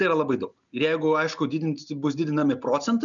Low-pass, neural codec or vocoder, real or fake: 7.2 kHz; none; real